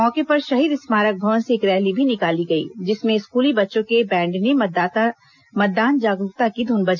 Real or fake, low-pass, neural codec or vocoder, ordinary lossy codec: real; 7.2 kHz; none; none